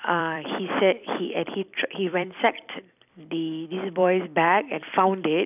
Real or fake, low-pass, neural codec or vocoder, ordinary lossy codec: fake; 3.6 kHz; vocoder, 44.1 kHz, 128 mel bands every 256 samples, BigVGAN v2; none